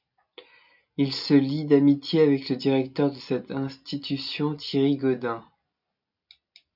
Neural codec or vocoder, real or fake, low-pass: none; real; 5.4 kHz